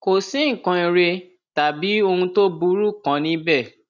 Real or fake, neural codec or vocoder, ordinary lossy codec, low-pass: real; none; none; 7.2 kHz